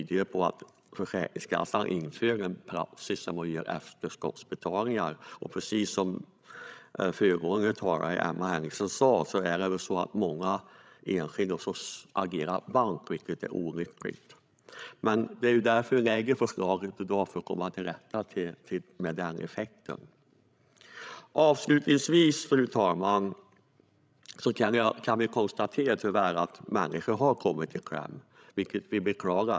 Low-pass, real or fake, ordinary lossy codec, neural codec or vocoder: none; fake; none; codec, 16 kHz, 16 kbps, FreqCodec, larger model